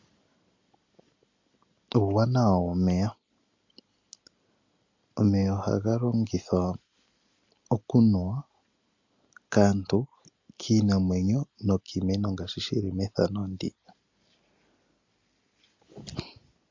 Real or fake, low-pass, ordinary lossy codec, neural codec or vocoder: real; 7.2 kHz; MP3, 48 kbps; none